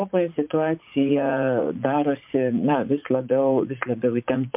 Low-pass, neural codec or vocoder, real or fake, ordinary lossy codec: 3.6 kHz; vocoder, 44.1 kHz, 80 mel bands, Vocos; fake; MP3, 32 kbps